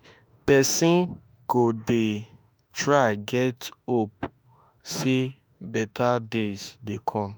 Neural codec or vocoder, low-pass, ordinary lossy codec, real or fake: autoencoder, 48 kHz, 32 numbers a frame, DAC-VAE, trained on Japanese speech; none; none; fake